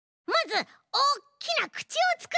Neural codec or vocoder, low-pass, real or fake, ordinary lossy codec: none; none; real; none